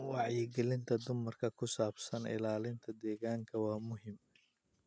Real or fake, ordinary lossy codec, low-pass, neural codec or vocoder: real; none; none; none